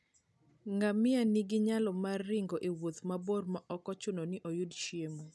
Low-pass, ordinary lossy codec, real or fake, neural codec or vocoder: none; none; real; none